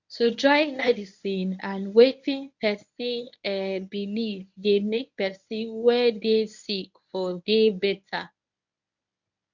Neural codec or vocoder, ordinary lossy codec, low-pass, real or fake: codec, 24 kHz, 0.9 kbps, WavTokenizer, medium speech release version 1; none; 7.2 kHz; fake